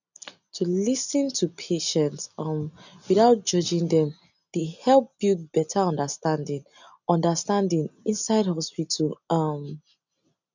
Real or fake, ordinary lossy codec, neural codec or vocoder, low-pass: real; none; none; 7.2 kHz